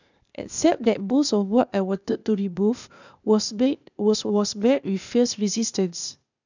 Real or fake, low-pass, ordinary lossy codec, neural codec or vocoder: fake; 7.2 kHz; none; codec, 16 kHz, 0.8 kbps, ZipCodec